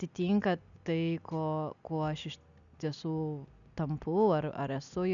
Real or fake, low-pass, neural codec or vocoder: real; 7.2 kHz; none